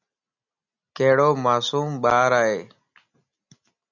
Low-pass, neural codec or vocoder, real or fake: 7.2 kHz; none; real